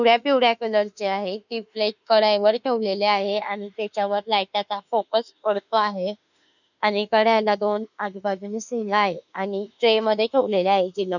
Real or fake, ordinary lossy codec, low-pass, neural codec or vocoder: fake; none; 7.2 kHz; autoencoder, 48 kHz, 32 numbers a frame, DAC-VAE, trained on Japanese speech